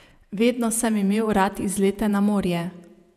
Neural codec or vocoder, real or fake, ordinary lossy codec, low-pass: vocoder, 48 kHz, 128 mel bands, Vocos; fake; none; 14.4 kHz